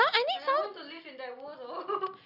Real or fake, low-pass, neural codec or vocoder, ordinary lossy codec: real; 5.4 kHz; none; none